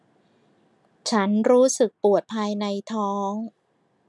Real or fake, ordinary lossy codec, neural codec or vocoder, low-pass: real; none; none; none